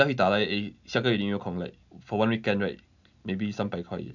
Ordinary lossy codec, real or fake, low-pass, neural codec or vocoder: none; real; 7.2 kHz; none